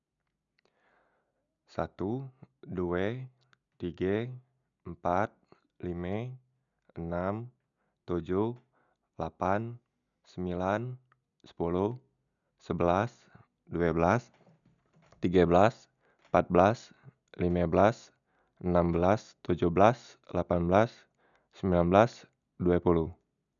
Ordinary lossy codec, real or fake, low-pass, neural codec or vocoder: none; real; 7.2 kHz; none